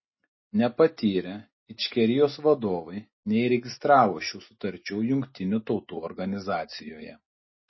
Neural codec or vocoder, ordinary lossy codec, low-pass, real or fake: none; MP3, 24 kbps; 7.2 kHz; real